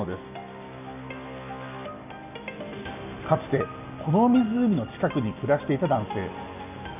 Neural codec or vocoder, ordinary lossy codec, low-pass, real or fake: none; none; 3.6 kHz; real